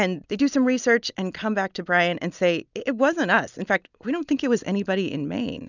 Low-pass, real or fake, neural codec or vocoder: 7.2 kHz; real; none